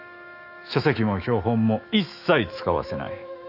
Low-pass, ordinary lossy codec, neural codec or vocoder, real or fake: 5.4 kHz; none; autoencoder, 48 kHz, 128 numbers a frame, DAC-VAE, trained on Japanese speech; fake